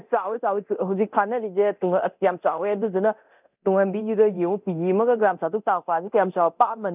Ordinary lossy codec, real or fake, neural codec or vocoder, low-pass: none; fake; codec, 24 kHz, 0.9 kbps, DualCodec; 3.6 kHz